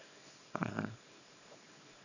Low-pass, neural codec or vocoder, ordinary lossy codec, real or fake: 7.2 kHz; codec, 24 kHz, 0.9 kbps, WavTokenizer, small release; none; fake